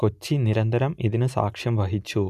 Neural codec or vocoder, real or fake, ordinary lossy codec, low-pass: none; real; MP3, 96 kbps; 14.4 kHz